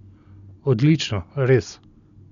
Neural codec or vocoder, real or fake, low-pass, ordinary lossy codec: none; real; 7.2 kHz; none